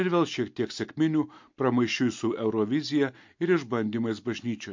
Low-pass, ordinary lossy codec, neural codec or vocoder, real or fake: 7.2 kHz; MP3, 48 kbps; none; real